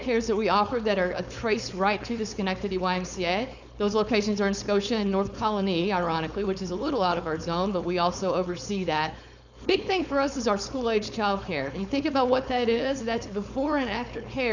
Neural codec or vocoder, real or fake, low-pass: codec, 16 kHz, 4.8 kbps, FACodec; fake; 7.2 kHz